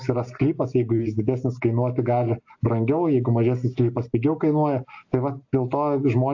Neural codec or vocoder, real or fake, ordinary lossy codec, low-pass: none; real; AAC, 48 kbps; 7.2 kHz